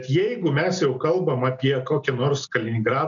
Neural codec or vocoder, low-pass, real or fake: none; 7.2 kHz; real